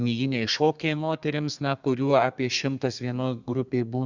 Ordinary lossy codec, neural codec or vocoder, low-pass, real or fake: Opus, 64 kbps; codec, 32 kHz, 1.9 kbps, SNAC; 7.2 kHz; fake